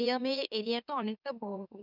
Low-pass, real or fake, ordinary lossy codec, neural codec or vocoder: 5.4 kHz; fake; none; autoencoder, 44.1 kHz, a latent of 192 numbers a frame, MeloTTS